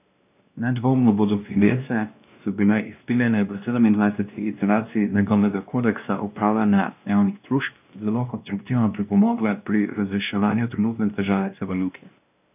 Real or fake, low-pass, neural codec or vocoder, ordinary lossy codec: fake; 3.6 kHz; codec, 16 kHz, 1 kbps, X-Codec, WavLM features, trained on Multilingual LibriSpeech; none